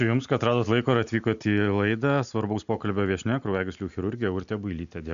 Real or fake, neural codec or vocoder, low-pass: real; none; 7.2 kHz